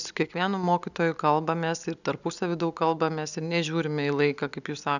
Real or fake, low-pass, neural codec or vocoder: real; 7.2 kHz; none